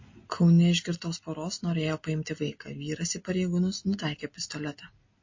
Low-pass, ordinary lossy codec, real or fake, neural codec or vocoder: 7.2 kHz; MP3, 32 kbps; real; none